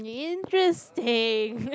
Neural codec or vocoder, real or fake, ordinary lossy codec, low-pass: none; real; none; none